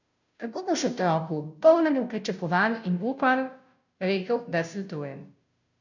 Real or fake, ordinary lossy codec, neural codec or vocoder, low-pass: fake; none; codec, 16 kHz, 0.5 kbps, FunCodec, trained on Chinese and English, 25 frames a second; 7.2 kHz